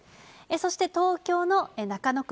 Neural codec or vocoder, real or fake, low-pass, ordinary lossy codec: none; real; none; none